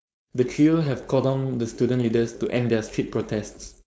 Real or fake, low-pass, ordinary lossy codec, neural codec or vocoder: fake; none; none; codec, 16 kHz, 4.8 kbps, FACodec